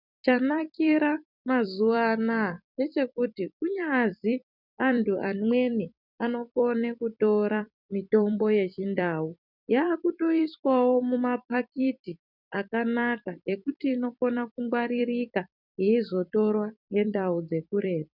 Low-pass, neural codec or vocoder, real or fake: 5.4 kHz; vocoder, 44.1 kHz, 128 mel bands every 256 samples, BigVGAN v2; fake